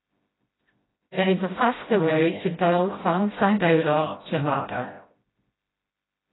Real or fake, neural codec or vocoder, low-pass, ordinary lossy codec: fake; codec, 16 kHz, 0.5 kbps, FreqCodec, smaller model; 7.2 kHz; AAC, 16 kbps